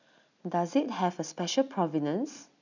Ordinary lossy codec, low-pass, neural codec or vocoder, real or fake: none; 7.2 kHz; none; real